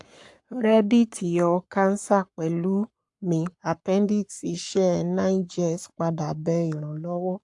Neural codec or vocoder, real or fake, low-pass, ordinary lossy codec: codec, 44.1 kHz, 7.8 kbps, Pupu-Codec; fake; 10.8 kHz; AAC, 64 kbps